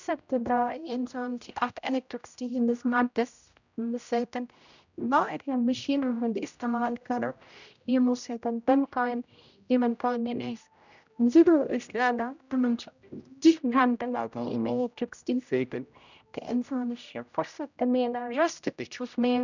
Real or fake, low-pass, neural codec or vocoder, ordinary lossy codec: fake; 7.2 kHz; codec, 16 kHz, 0.5 kbps, X-Codec, HuBERT features, trained on general audio; none